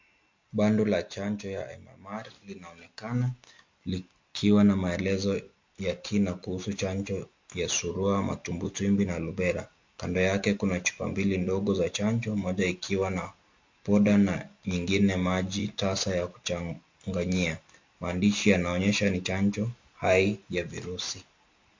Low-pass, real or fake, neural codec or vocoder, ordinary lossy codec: 7.2 kHz; real; none; MP3, 48 kbps